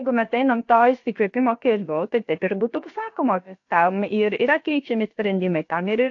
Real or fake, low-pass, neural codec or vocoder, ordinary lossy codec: fake; 7.2 kHz; codec, 16 kHz, about 1 kbps, DyCAST, with the encoder's durations; AAC, 48 kbps